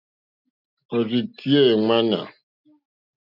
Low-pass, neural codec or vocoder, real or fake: 5.4 kHz; none; real